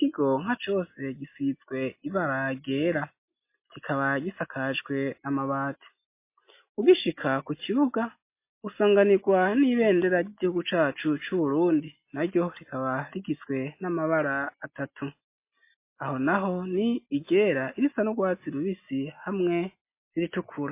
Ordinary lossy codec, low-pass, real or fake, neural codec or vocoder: MP3, 24 kbps; 3.6 kHz; real; none